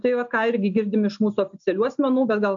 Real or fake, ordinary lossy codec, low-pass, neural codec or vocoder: real; MP3, 64 kbps; 7.2 kHz; none